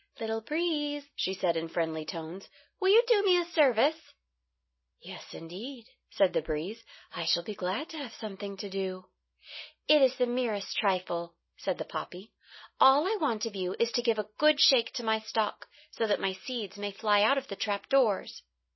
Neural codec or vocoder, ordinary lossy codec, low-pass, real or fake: none; MP3, 24 kbps; 7.2 kHz; real